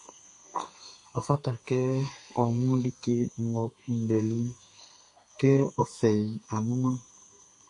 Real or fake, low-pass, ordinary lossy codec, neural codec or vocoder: fake; 10.8 kHz; MP3, 48 kbps; codec, 32 kHz, 1.9 kbps, SNAC